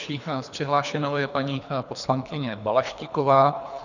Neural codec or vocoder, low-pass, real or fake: codec, 24 kHz, 3 kbps, HILCodec; 7.2 kHz; fake